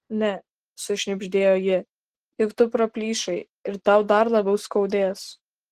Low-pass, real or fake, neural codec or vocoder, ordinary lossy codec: 14.4 kHz; real; none; Opus, 16 kbps